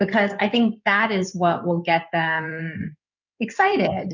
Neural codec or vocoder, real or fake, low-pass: codec, 16 kHz in and 24 kHz out, 2.2 kbps, FireRedTTS-2 codec; fake; 7.2 kHz